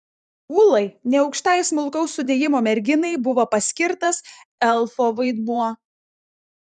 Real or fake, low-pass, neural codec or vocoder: fake; 10.8 kHz; vocoder, 44.1 kHz, 128 mel bands every 512 samples, BigVGAN v2